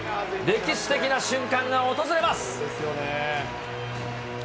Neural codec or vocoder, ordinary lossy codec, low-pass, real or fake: none; none; none; real